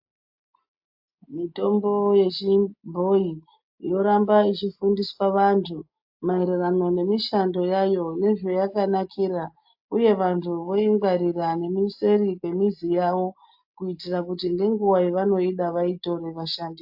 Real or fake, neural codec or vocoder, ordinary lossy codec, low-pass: real; none; AAC, 48 kbps; 5.4 kHz